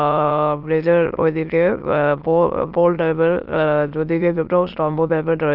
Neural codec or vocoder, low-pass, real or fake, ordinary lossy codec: autoencoder, 22.05 kHz, a latent of 192 numbers a frame, VITS, trained on many speakers; 5.4 kHz; fake; Opus, 32 kbps